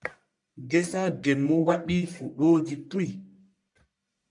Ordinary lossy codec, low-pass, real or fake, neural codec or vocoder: MP3, 64 kbps; 10.8 kHz; fake; codec, 44.1 kHz, 1.7 kbps, Pupu-Codec